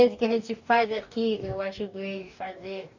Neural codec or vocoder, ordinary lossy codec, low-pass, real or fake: codec, 44.1 kHz, 2.6 kbps, DAC; none; 7.2 kHz; fake